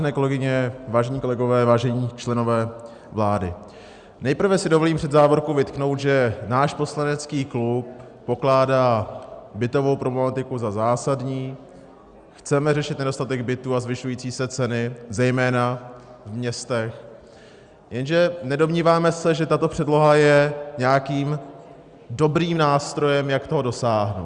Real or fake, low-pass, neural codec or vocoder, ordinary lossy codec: real; 9.9 kHz; none; Opus, 64 kbps